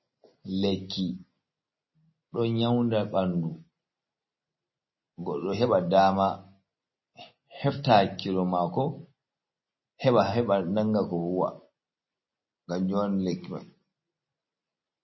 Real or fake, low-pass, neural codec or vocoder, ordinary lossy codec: real; 7.2 kHz; none; MP3, 24 kbps